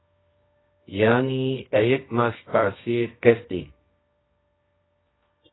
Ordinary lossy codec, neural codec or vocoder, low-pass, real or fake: AAC, 16 kbps; codec, 24 kHz, 0.9 kbps, WavTokenizer, medium music audio release; 7.2 kHz; fake